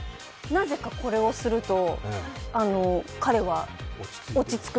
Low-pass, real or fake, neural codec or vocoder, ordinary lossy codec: none; real; none; none